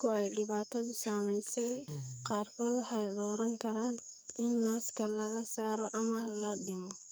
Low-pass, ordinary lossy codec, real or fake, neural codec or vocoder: none; none; fake; codec, 44.1 kHz, 2.6 kbps, SNAC